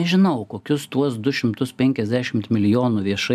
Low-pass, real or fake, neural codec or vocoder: 14.4 kHz; real; none